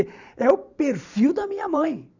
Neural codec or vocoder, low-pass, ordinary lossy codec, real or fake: vocoder, 44.1 kHz, 128 mel bands every 512 samples, BigVGAN v2; 7.2 kHz; none; fake